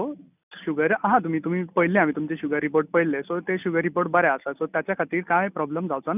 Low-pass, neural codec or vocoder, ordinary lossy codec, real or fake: 3.6 kHz; none; none; real